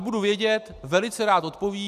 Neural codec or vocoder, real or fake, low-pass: none; real; 14.4 kHz